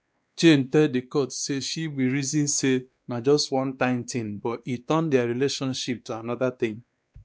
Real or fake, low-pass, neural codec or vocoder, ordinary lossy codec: fake; none; codec, 16 kHz, 2 kbps, X-Codec, WavLM features, trained on Multilingual LibriSpeech; none